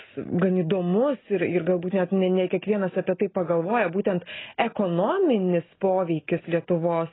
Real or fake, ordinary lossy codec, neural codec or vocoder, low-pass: real; AAC, 16 kbps; none; 7.2 kHz